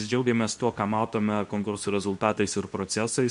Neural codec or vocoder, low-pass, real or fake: codec, 24 kHz, 0.9 kbps, WavTokenizer, medium speech release version 2; 10.8 kHz; fake